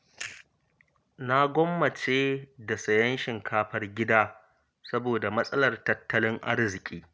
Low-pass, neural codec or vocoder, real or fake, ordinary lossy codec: none; none; real; none